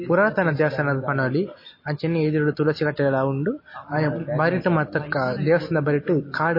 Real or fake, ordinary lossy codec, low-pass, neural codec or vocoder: real; MP3, 24 kbps; 5.4 kHz; none